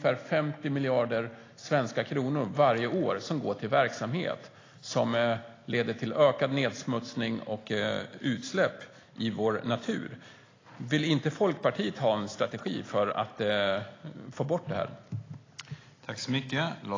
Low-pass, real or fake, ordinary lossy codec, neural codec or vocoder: 7.2 kHz; real; AAC, 32 kbps; none